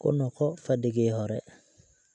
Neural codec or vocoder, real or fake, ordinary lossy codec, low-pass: none; real; none; 9.9 kHz